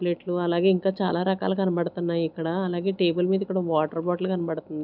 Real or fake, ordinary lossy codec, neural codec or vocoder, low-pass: real; none; none; 5.4 kHz